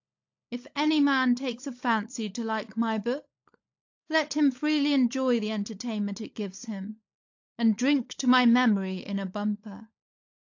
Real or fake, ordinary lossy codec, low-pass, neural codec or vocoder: fake; AAC, 48 kbps; 7.2 kHz; codec, 16 kHz, 16 kbps, FunCodec, trained on LibriTTS, 50 frames a second